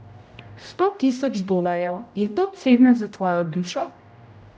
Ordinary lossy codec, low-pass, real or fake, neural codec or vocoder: none; none; fake; codec, 16 kHz, 0.5 kbps, X-Codec, HuBERT features, trained on general audio